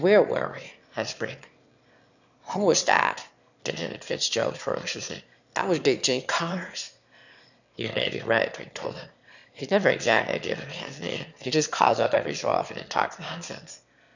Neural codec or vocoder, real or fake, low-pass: autoencoder, 22.05 kHz, a latent of 192 numbers a frame, VITS, trained on one speaker; fake; 7.2 kHz